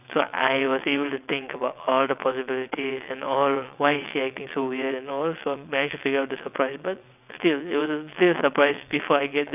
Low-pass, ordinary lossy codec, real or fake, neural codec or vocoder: 3.6 kHz; none; fake; vocoder, 22.05 kHz, 80 mel bands, WaveNeXt